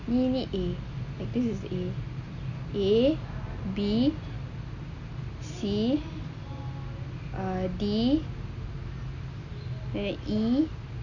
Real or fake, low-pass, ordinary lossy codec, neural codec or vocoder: real; 7.2 kHz; none; none